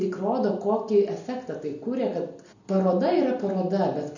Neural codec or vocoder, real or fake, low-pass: none; real; 7.2 kHz